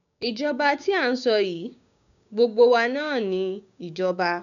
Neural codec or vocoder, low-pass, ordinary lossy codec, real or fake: codec, 16 kHz, 6 kbps, DAC; 7.2 kHz; none; fake